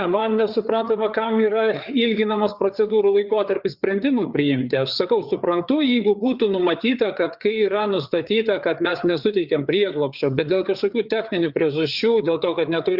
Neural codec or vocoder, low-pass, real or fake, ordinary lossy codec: codec, 16 kHz, 4 kbps, FreqCodec, larger model; 5.4 kHz; fake; Opus, 64 kbps